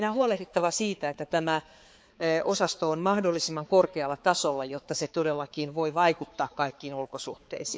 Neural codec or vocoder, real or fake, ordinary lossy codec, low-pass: codec, 16 kHz, 4 kbps, X-Codec, HuBERT features, trained on balanced general audio; fake; none; none